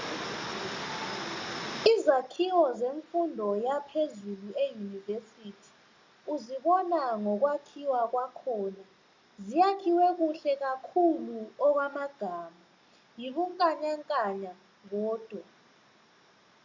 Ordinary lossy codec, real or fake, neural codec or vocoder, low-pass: MP3, 64 kbps; real; none; 7.2 kHz